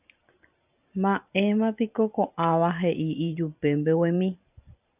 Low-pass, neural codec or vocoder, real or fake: 3.6 kHz; vocoder, 44.1 kHz, 128 mel bands every 512 samples, BigVGAN v2; fake